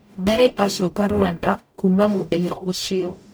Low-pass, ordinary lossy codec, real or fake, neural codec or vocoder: none; none; fake; codec, 44.1 kHz, 0.9 kbps, DAC